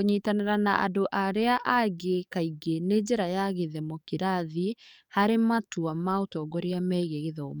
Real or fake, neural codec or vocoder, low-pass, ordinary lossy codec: fake; codec, 44.1 kHz, 7.8 kbps, DAC; 19.8 kHz; none